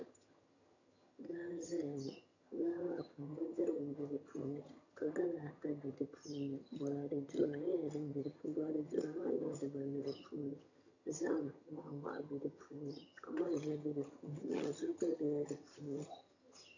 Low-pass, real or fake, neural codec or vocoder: 7.2 kHz; fake; vocoder, 22.05 kHz, 80 mel bands, HiFi-GAN